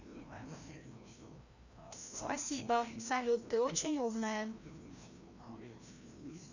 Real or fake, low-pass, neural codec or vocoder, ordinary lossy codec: fake; 7.2 kHz; codec, 16 kHz, 1 kbps, FreqCodec, larger model; none